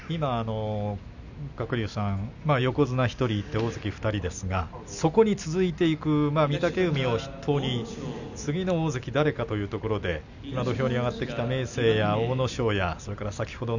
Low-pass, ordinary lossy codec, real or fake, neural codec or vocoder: 7.2 kHz; none; real; none